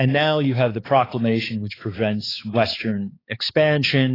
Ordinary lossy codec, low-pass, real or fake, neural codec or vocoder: AAC, 24 kbps; 5.4 kHz; fake; codec, 16 kHz, 4 kbps, X-Codec, HuBERT features, trained on balanced general audio